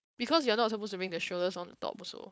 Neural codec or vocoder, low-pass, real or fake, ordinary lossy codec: codec, 16 kHz, 4.8 kbps, FACodec; none; fake; none